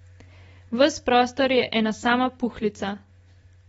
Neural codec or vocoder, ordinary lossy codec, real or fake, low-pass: none; AAC, 24 kbps; real; 19.8 kHz